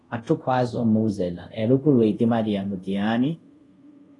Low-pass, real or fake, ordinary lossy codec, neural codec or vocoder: 10.8 kHz; fake; AAC, 32 kbps; codec, 24 kHz, 0.5 kbps, DualCodec